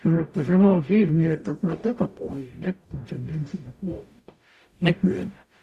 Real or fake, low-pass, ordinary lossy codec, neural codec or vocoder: fake; 14.4 kHz; Opus, 64 kbps; codec, 44.1 kHz, 0.9 kbps, DAC